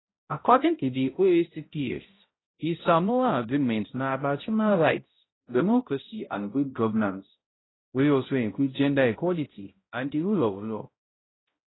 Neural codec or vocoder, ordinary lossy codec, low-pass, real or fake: codec, 16 kHz, 0.5 kbps, X-Codec, HuBERT features, trained on balanced general audio; AAC, 16 kbps; 7.2 kHz; fake